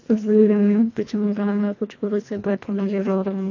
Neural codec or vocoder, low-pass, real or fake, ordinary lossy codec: codec, 24 kHz, 1.5 kbps, HILCodec; 7.2 kHz; fake; AAC, 48 kbps